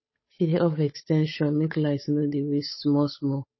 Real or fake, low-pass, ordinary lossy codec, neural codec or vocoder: fake; 7.2 kHz; MP3, 24 kbps; codec, 16 kHz, 8 kbps, FunCodec, trained on Chinese and English, 25 frames a second